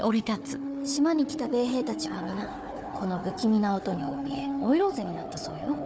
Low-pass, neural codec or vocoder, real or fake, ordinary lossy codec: none; codec, 16 kHz, 4 kbps, FunCodec, trained on Chinese and English, 50 frames a second; fake; none